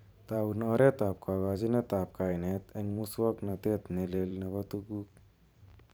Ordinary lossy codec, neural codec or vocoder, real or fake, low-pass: none; vocoder, 44.1 kHz, 128 mel bands every 512 samples, BigVGAN v2; fake; none